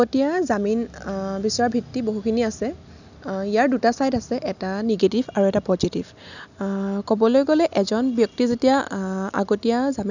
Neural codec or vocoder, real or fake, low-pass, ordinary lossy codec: none; real; 7.2 kHz; none